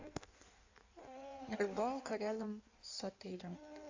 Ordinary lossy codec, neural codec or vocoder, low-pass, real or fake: AAC, 48 kbps; codec, 16 kHz in and 24 kHz out, 1.1 kbps, FireRedTTS-2 codec; 7.2 kHz; fake